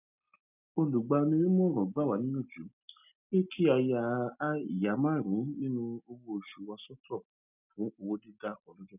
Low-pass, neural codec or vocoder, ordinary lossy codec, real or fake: 3.6 kHz; none; none; real